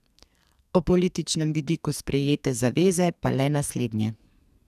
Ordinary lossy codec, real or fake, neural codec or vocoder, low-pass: none; fake; codec, 44.1 kHz, 2.6 kbps, SNAC; 14.4 kHz